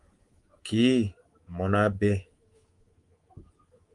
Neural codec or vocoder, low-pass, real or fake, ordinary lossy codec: codec, 24 kHz, 3.1 kbps, DualCodec; 10.8 kHz; fake; Opus, 24 kbps